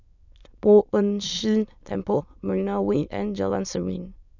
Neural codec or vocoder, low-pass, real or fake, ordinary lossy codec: autoencoder, 22.05 kHz, a latent of 192 numbers a frame, VITS, trained on many speakers; 7.2 kHz; fake; none